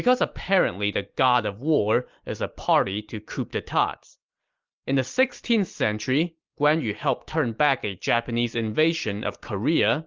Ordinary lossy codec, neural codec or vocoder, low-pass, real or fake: Opus, 32 kbps; none; 7.2 kHz; real